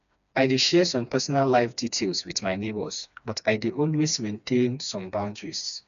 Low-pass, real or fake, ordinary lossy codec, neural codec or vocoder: 7.2 kHz; fake; none; codec, 16 kHz, 2 kbps, FreqCodec, smaller model